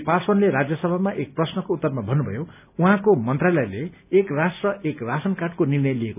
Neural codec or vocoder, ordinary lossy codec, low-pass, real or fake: none; none; 3.6 kHz; real